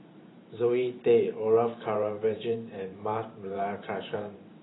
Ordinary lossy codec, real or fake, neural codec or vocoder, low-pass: AAC, 16 kbps; fake; vocoder, 44.1 kHz, 128 mel bands every 512 samples, BigVGAN v2; 7.2 kHz